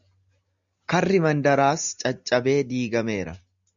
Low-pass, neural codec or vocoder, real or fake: 7.2 kHz; none; real